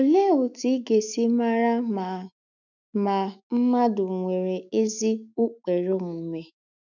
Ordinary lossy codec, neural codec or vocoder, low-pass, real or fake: none; autoencoder, 48 kHz, 128 numbers a frame, DAC-VAE, trained on Japanese speech; 7.2 kHz; fake